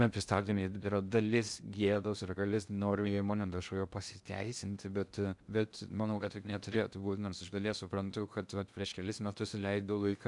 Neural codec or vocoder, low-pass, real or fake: codec, 16 kHz in and 24 kHz out, 0.6 kbps, FocalCodec, streaming, 2048 codes; 10.8 kHz; fake